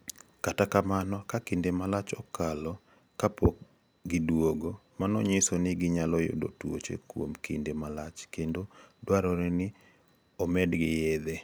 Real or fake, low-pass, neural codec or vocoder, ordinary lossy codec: real; none; none; none